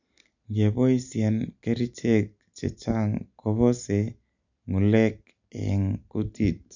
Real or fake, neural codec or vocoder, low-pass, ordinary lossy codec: real; none; 7.2 kHz; none